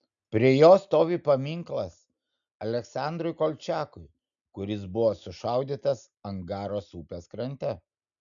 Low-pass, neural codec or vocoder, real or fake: 7.2 kHz; none; real